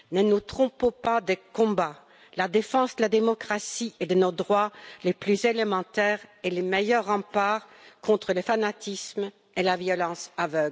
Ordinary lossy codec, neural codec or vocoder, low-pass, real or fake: none; none; none; real